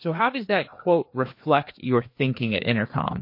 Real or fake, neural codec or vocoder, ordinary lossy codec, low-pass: fake; codec, 16 kHz, 2 kbps, FreqCodec, larger model; MP3, 32 kbps; 5.4 kHz